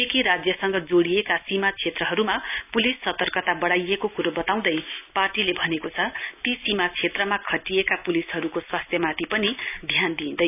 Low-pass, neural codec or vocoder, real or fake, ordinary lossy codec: 3.6 kHz; none; real; none